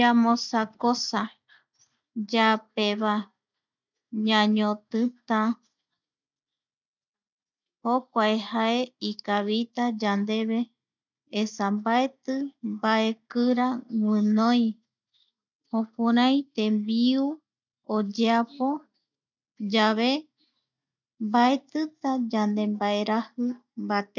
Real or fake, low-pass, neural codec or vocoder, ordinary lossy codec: real; 7.2 kHz; none; none